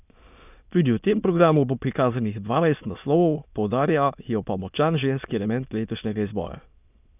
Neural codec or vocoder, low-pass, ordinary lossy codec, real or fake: autoencoder, 22.05 kHz, a latent of 192 numbers a frame, VITS, trained on many speakers; 3.6 kHz; none; fake